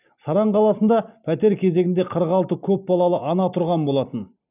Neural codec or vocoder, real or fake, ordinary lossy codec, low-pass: none; real; none; 3.6 kHz